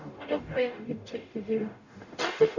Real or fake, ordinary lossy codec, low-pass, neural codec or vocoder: fake; none; 7.2 kHz; codec, 44.1 kHz, 0.9 kbps, DAC